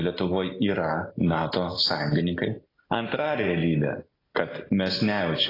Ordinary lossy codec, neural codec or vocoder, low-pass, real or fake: AAC, 24 kbps; none; 5.4 kHz; real